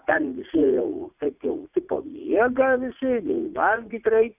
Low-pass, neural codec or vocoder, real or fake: 3.6 kHz; vocoder, 44.1 kHz, 80 mel bands, Vocos; fake